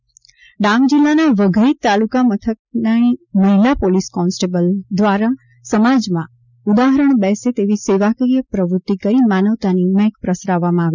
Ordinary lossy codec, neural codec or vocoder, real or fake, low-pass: none; none; real; 7.2 kHz